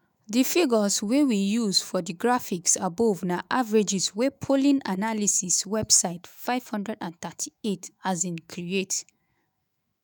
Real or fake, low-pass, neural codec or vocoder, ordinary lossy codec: fake; none; autoencoder, 48 kHz, 128 numbers a frame, DAC-VAE, trained on Japanese speech; none